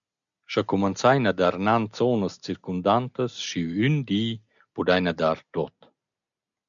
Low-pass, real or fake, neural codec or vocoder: 7.2 kHz; real; none